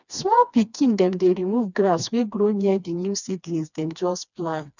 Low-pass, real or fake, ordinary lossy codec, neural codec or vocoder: 7.2 kHz; fake; none; codec, 16 kHz, 2 kbps, FreqCodec, smaller model